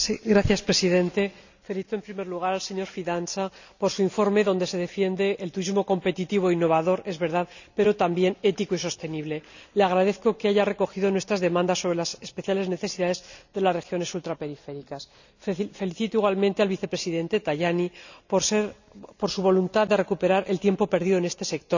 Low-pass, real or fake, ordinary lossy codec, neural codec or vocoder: 7.2 kHz; real; none; none